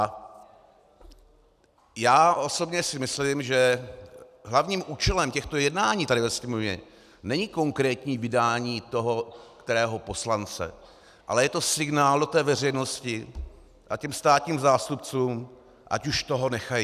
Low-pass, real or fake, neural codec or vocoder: 14.4 kHz; real; none